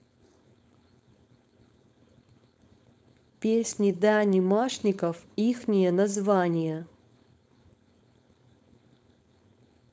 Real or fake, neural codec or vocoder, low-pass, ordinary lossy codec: fake; codec, 16 kHz, 4.8 kbps, FACodec; none; none